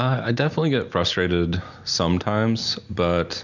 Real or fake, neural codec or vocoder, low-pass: real; none; 7.2 kHz